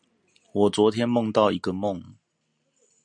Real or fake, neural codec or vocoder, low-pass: real; none; 9.9 kHz